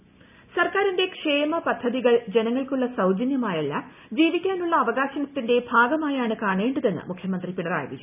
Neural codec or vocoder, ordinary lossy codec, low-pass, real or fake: none; none; 3.6 kHz; real